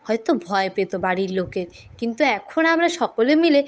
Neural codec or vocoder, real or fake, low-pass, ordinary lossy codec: codec, 16 kHz, 8 kbps, FunCodec, trained on Chinese and English, 25 frames a second; fake; none; none